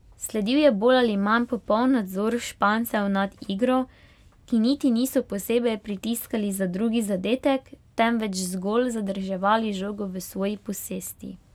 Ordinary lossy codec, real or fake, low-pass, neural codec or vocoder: none; real; 19.8 kHz; none